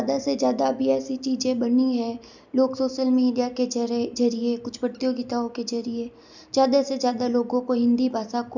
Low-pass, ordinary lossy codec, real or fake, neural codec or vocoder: 7.2 kHz; none; real; none